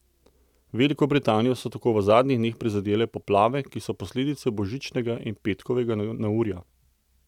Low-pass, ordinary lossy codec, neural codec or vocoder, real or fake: 19.8 kHz; none; none; real